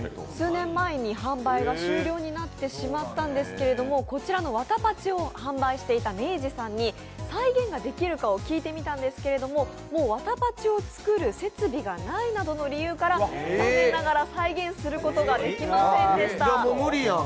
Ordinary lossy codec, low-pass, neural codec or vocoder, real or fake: none; none; none; real